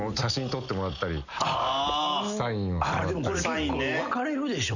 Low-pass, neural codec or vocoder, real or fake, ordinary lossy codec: 7.2 kHz; none; real; none